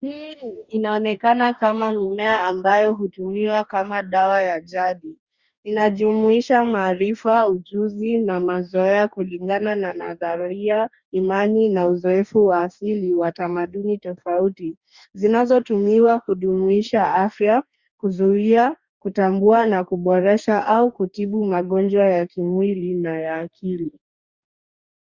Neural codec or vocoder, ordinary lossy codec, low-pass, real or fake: codec, 44.1 kHz, 2.6 kbps, DAC; Opus, 64 kbps; 7.2 kHz; fake